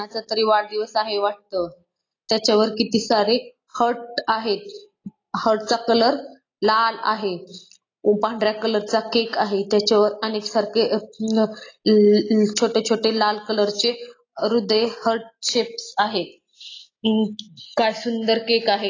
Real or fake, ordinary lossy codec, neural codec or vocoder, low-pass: real; AAC, 32 kbps; none; 7.2 kHz